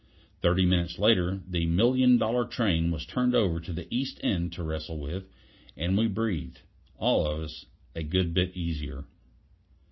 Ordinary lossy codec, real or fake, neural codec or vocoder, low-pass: MP3, 24 kbps; real; none; 7.2 kHz